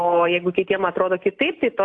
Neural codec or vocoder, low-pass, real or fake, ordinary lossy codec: none; 9.9 kHz; real; AAC, 48 kbps